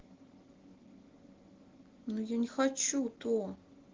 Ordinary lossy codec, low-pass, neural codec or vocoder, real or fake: Opus, 16 kbps; 7.2 kHz; none; real